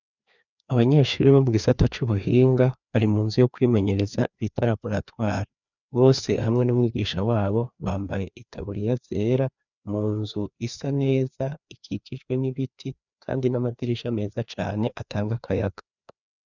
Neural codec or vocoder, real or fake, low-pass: codec, 16 kHz, 2 kbps, FreqCodec, larger model; fake; 7.2 kHz